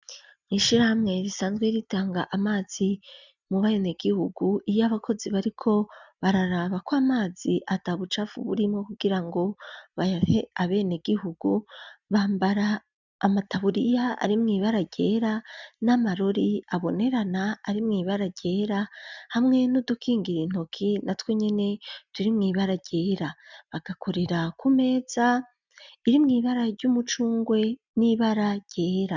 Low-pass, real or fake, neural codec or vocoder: 7.2 kHz; real; none